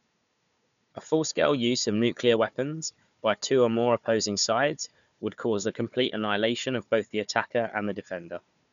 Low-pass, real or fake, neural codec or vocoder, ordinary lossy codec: 7.2 kHz; fake; codec, 16 kHz, 4 kbps, FunCodec, trained on Chinese and English, 50 frames a second; none